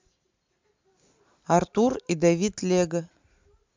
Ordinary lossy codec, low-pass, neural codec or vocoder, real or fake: MP3, 64 kbps; 7.2 kHz; none; real